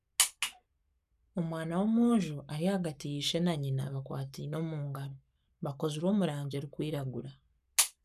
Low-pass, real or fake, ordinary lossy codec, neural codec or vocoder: 14.4 kHz; fake; none; codec, 44.1 kHz, 7.8 kbps, Pupu-Codec